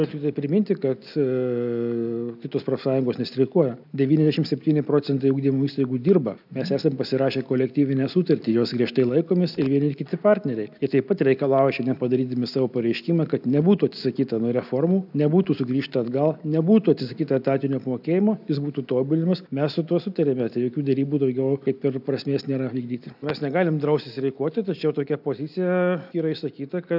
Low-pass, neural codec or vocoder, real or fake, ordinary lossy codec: 5.4 kHz; none; real; AAC, 48 kbps